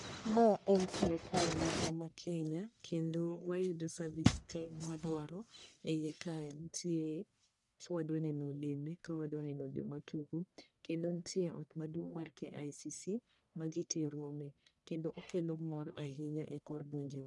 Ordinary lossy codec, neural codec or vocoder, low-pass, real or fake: none; codec, 44.1 kHz, 1.7 kbps, Pupu-Codec; 10.8 kHz; fake